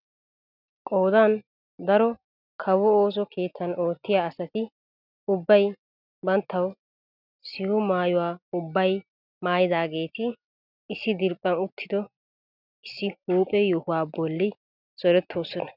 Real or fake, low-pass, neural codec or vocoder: real; 5.4 kHz; none